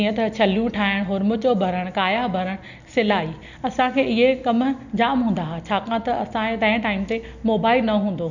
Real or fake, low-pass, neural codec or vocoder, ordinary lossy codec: real; 7.2 kHz; none; none